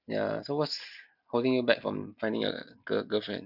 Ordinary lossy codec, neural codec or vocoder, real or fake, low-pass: none; none; real; 5.4 kHz